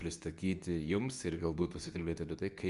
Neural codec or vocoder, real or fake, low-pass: codec, 24 kHz, 0.9 kbps, WavTokenizer, medium speech release version 2; fake; 10.8 kHz